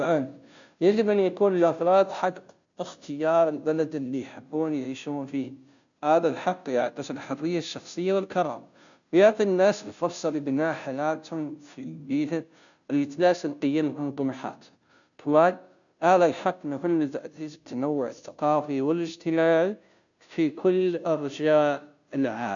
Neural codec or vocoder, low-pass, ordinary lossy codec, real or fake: codec, 16 kHz, 0.5 kbps, FunCodec, trained on Chinese and English, 25 frames a second; 7.2 kHz; MP3, 96 kbps; fake